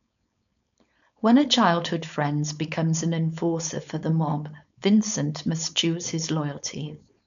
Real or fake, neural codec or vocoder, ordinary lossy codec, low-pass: fake; codec, 16 kHz, 4.8 kbps, FACodec; none; 7.2 kHz